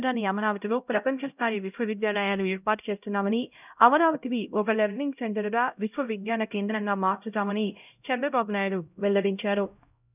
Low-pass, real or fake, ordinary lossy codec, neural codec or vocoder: 3.6 kHz; fake; none; codec, 16 kHz, 0.5 kbps, X-Codec, HuBERT features, trained on LibriSpeech